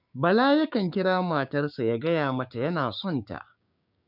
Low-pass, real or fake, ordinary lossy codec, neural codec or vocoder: 5.4 kHz; fake; none; codec, 16 kHz, 6 kbps, DAC